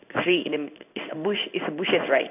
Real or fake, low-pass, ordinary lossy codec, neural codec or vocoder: fake; 3.6 kHz; none; codec, 16 kHz in and 24 kHz out, 1 kbps, XY-Tokenizer